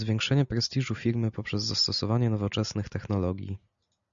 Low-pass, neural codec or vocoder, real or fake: 7.2 kHz; none; real